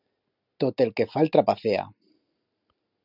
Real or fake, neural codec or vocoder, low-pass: real; none; 5.4 kHz